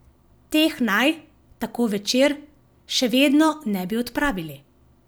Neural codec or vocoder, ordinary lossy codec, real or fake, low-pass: none; none; real; none